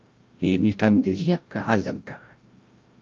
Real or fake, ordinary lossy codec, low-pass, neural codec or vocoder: fake; Opus, 24 kbps; 7.2 kHz; codec, 16 kHz, 0.5 kbps, FreqCodec, larger model